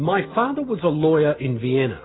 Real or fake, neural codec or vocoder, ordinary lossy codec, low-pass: real; none; AAC, 16 kbps; 7.2 kHz